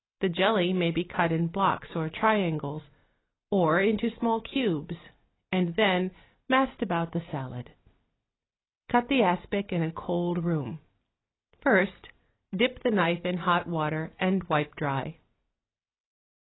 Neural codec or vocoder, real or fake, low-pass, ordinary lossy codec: none; real; 7.2 kHz; AAC, 16 kbps